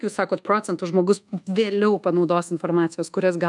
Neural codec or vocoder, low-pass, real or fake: codec, 24 kHz, 1.2 kbps, DualCodec; 10.8 kHz; fake